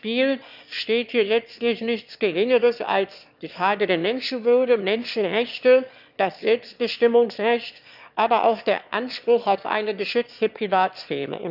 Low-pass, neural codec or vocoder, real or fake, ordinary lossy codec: 5.4 kHz; autoencoder, 22.05 kHz, a latent of 192 numbers a frame, VITS, trained on one speaker; fake; none